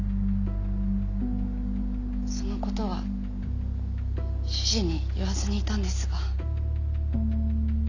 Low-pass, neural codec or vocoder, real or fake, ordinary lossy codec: 7.2 kHz; none; real; none